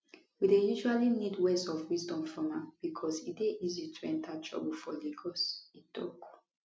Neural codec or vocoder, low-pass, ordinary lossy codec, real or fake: none; none; none; real